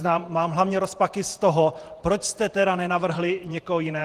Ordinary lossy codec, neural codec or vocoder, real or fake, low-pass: Opus, 24 kbps; vocoder, 48 kHz, 128 mel bands, Vocos; fake; 14.4 kHz